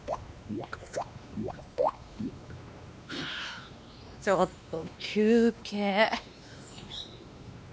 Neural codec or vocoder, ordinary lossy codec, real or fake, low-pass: codec, 16 kHz, 2 kbps, X-Codec, WavLM features, trained on Multilingual LibriSpeech; none; fake; none